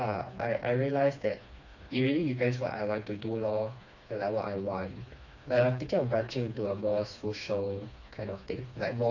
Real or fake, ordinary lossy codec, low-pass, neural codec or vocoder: fake; none; 7.2 kHz; codec, 16 kHz, 2 kbps, FreqCodec, smaller model